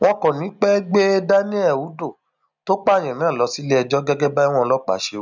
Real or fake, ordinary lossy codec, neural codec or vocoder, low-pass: real; none; none; 7.2 kHz